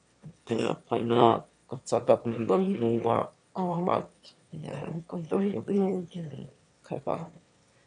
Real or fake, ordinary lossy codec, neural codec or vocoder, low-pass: fake; MP3, 64 kbps; autoencoder, 22.05 kHz, a latent of 192 numbers a frame, VITS, trained on one speaker; 9.9 kHz